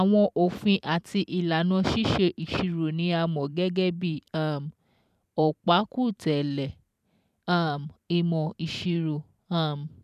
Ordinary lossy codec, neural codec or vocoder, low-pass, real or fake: none; vocoder, 44.1 kHz, 128 mel bands every 512 samples, BigVGAN v2; 14.4 kHz; fake